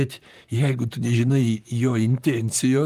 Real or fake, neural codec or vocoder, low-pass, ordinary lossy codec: fake; vocoder, 44.1 kHz, 128 mel bands, Pupu-Vocoder; 14.4 kHz; Opus, 32 kbps